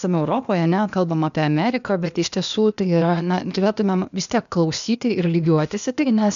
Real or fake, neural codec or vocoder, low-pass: fake; codec, 16 kHz, 0.8 kbps, ZipCodec; 7.2 kHz